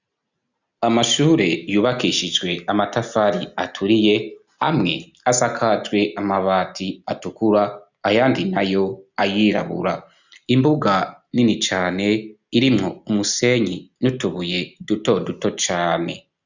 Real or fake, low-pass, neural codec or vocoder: real; 7.2 kHz; none